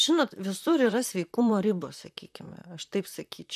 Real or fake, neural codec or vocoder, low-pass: fake; vocoder, 44.1 kHz, 128 mel bands, Pupu-Vocoder; 14.4 kHz